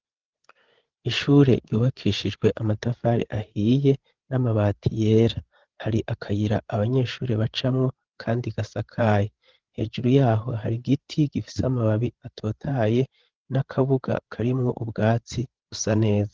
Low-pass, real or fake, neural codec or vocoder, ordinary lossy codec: 7.2 kHz; fake; codec, 16 kHz, 8 kbps, FreqCodec, larger model; Opus, 16 kbps